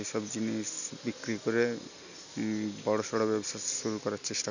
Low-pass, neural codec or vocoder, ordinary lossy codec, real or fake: 7.2 kHz; none; none; real